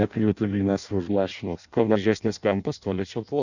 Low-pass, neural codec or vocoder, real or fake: 7.2 kHz; codec, 16 kHz in and 24 kHz out, 0.6 kbps, FireRedTTS-2 codec; fake